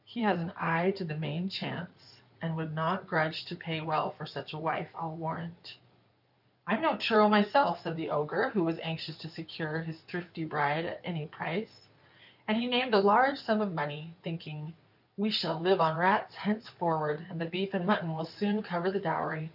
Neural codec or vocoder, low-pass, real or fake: codec, 16 kHz in and 24 kHz out, 2.2 kbps, FireRedTTS-2 codec; 5.4 kHz; fake